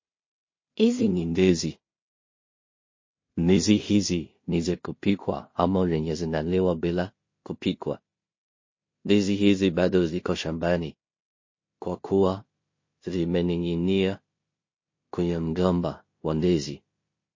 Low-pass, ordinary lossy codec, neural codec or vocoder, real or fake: 7.2 kHz; MP3, 32 kbps; codec, 16 kHz in and 24 kHz out, 0.4 kbps, LongCat-Audio-Codec, two codebook decoder; fake